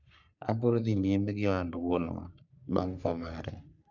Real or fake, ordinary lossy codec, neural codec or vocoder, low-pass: fake; none; codec, 44.1 kHz, 3.4 kbps, Pupu-Codec; 7.2 kHz